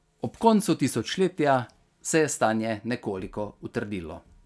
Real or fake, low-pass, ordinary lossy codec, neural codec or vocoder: real; none; none; none